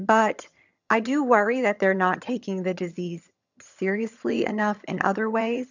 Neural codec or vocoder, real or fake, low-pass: vocoder, 22.05 kHz, 80 mel bands, HiFi-GAN; fake; 7.2 kHz